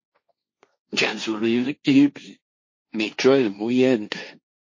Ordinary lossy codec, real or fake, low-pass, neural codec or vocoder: MP3, 32 kbps; fake; 7.2 kHz; codec, 16 kHz, 1.1 kbps, Voila-Tokenizer